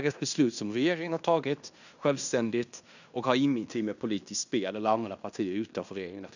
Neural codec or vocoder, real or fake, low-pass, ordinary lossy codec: codec, 16 kHz in and 24 kHz out, 0.9 kbps, LongCat-Audio-Codec, fine tuned four codebook decoder; fake; 7.2 kHz; none